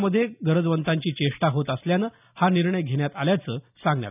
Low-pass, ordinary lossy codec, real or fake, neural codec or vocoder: 3.6 kHz; none; real; none